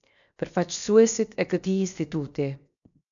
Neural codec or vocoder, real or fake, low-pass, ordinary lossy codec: codec, 16 kHz, 0.7 kbps, FocalCodec; fake; 7.2 kHz; MP3, 96 kbps